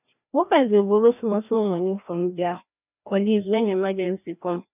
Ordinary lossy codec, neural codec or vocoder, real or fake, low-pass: none; codec, 16 kHz, 1 kbps, FreqCodec, larger model; fake; 3.6 kHz